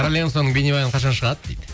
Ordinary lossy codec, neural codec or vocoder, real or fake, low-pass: none; none; real; none